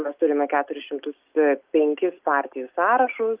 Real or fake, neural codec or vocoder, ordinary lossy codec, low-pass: real; none; Opus, 32 kbps; 3.6 kHz